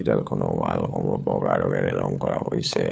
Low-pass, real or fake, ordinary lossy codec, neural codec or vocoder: none; fake; none; codec, 16 kHz, 4 kbps, FunCodec, trained on LibriTTS, 50 frames a second